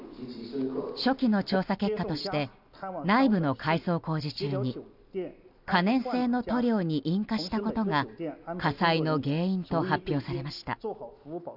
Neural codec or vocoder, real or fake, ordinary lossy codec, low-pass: none; real; none; 5.4 kHz